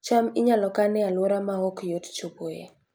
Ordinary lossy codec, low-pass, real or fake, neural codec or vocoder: none; none; real; none